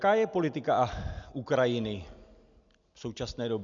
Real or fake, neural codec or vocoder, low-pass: real; none; 7.2 kHz